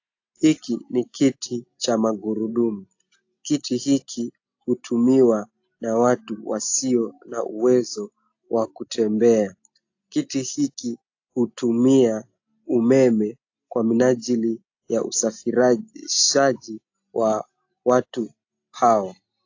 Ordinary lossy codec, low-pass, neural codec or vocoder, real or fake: AAC, 48 kbps; 7.2 kHz; none; real